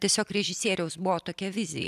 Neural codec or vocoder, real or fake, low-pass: none; real; 14.4 kHz